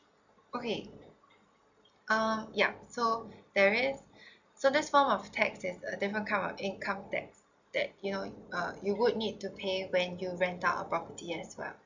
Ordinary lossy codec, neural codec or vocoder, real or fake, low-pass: none; none; real; 7.2 kHz